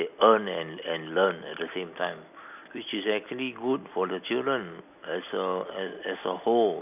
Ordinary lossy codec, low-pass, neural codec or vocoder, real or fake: none; 3.6 kHz; none; real